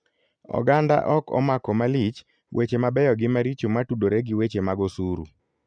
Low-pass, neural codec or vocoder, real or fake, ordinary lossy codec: 9.9 kHz; none; real; none